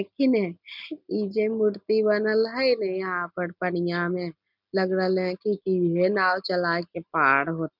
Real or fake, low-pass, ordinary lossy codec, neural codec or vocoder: real; 5.4 kHz; none; none